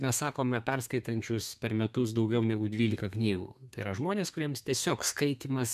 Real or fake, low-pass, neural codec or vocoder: fake; 14.4 kHz; codec, 32 kHz, 1.9 kbps, SNAC